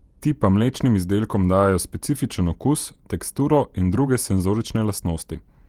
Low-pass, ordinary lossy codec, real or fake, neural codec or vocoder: 19.8 kHz; Opus, 24 kbps; fake; vocoder, 48 kHz, 128 mel bands, Vocos